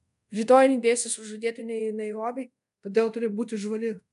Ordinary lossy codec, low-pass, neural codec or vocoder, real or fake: AAC, 96 kbps; 10.8 kHz; codec, 24 kHz, 0.5 kbps, DualCodec; fake